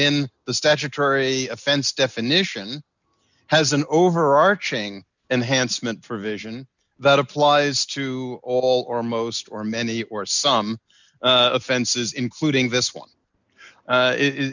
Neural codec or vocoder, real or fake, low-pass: none; real; 7.2 kHz